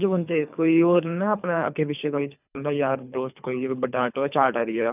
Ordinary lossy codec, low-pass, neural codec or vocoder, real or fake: none; 3.6 kHz; codec, 24 kHz, 3 kbps, HILCodec; fake